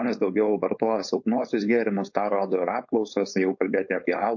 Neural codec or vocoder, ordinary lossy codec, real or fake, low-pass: codec, 16 kHz, 4.8 kbps, FACodec; MP3, 48 kbps; fake; 7.2 kHz